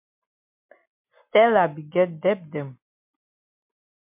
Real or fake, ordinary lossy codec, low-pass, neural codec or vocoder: real; MP3, 32 kbps; 3.6 kHz; none